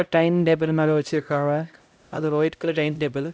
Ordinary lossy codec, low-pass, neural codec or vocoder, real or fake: none; none; codec, 16 kHz, 0.5 kbps, X-Codec, HuBERT features, trained on LibriSpeech; fake